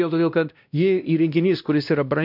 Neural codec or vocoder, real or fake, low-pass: codec, 16 kHz, 1 kbps, X-Codec, WavLM features, trained on Multilingual LibriSpeech; fake; 5.4 kHz